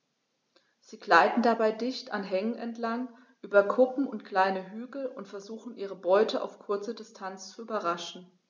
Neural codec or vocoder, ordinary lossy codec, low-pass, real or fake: none; none; none; real